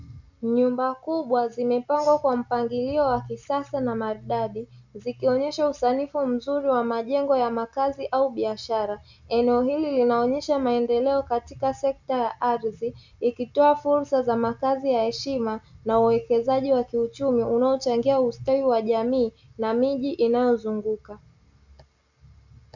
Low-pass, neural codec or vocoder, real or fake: 7.2 kHz; none; real